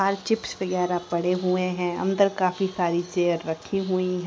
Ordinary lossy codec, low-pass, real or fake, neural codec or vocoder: none; none; real; none